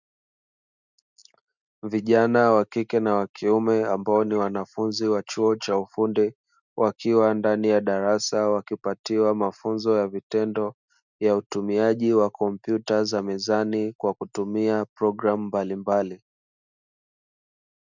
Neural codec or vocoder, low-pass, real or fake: none; 7.2 kHz; real